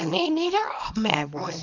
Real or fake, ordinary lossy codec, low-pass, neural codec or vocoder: fake; none; 7.2 kHz; codec, 24 kHz, 0.9 kbps, WavTokenizer, small release